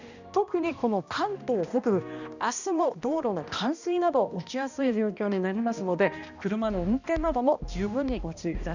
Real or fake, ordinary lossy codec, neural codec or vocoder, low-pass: fake; none; codec, 16 kHz, 1 kbps, X-Codec, HuBERT features, trained on balanced general audio; 7.2 kHz